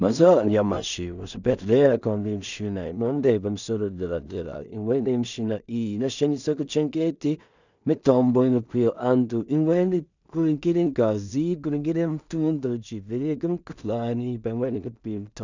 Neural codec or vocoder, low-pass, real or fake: codec, 16 kHz in and 24 kHz out, 0.4 kbps, LongCat-Audio-Codec, two codebook decoder; 7.2 kHz; fake